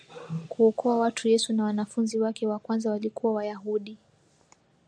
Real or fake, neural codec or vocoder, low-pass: real; none; 9.9 kHz